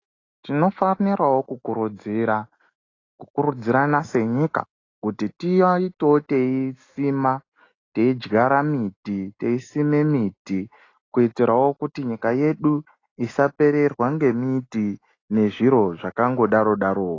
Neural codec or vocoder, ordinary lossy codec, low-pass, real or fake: none; AAC, 32 kbps; 7.2 kHz; real